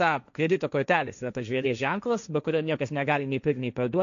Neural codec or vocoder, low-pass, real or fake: codec, 16 kHz, 1.1 kbps, Voila-Tokenizer; 7.2 kHz; fake